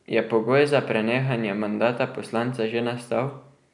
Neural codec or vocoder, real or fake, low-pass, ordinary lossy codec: none; real; 10.8 kHz; none